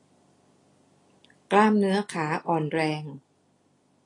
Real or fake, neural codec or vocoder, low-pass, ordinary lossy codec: real; none; 10.8 kHz; AAC, 32 kbps